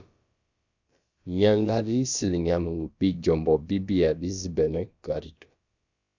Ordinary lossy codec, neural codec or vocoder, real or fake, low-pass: Opus, 64 kbps; codec, 16 kHz, about 1 kbps, DyCAST, with the encoder's durations; fake; 7.2 kHz